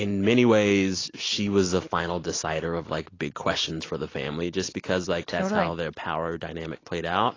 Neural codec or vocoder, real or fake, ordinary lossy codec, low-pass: none; real; AAC, 32 kbps; 7.2 kHz